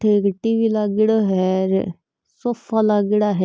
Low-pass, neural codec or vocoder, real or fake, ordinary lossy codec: none; none; real; none